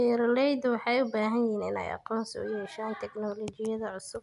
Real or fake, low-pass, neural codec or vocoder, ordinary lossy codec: real; 10.8 kHz; none; none